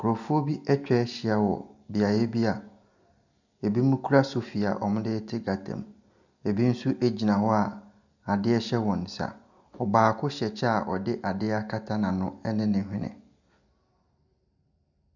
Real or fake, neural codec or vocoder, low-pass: real; none; 7.2 kHz